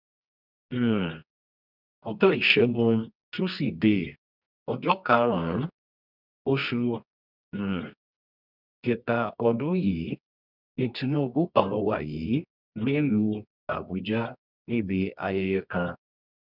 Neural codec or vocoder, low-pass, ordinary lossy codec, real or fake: codec, 24 kHz, 0.9 kbps, WavTokenizer, medium music audio release; 5.4 kHz; none; fake